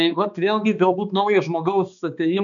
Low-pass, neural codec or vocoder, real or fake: 7.2 kHz; codec, 16 kHz, 2 kbps, X-Codec, HuBERT features, trained on balanced general audio; fake